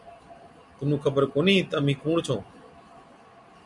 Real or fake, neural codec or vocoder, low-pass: real; none; 10.8 kHz